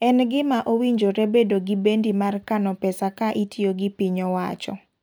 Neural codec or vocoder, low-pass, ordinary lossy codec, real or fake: none; none; none; real